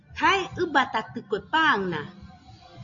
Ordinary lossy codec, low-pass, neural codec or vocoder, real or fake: MP3, 96 kbps; 7.2 kHz; none; real